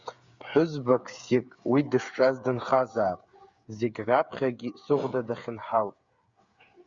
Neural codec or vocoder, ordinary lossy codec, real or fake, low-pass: codec, 16 kHz, 8 kbps, FreqCodec, smaller model; Opus, 64 kbps; fake; 7.2 kHz